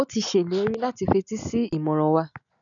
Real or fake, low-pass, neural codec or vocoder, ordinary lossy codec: real; 7.2 kHz; none; none